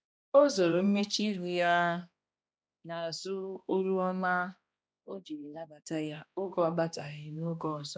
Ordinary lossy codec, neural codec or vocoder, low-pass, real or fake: none; codec, 16 kHz, 1 kbps, X-Codec, HuBERT features, trained on balanced general audio; none; fake